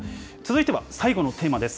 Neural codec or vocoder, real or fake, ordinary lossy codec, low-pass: none; real; none; none